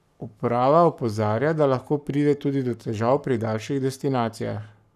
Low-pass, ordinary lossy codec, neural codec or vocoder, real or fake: 14.4 kHz; none; codec, 44.1 kHz, 7.8 kbps, Pupu-Codec; fake